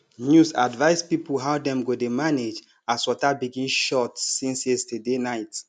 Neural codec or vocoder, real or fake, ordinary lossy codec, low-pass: none; real; none; 9.9 kHz